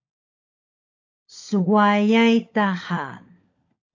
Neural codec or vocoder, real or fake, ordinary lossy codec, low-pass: codec, 16 kHz, 16 kbps, FunCodec, trained on LibriTTS, 50 frames a second; fake; AAC, 48 kbps; 7.2 kHz